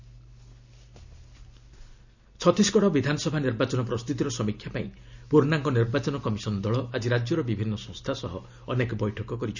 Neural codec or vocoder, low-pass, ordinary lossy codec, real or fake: none; 7.2 kHz; none; real